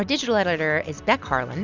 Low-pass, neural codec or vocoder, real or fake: 7.2 kHz; none; real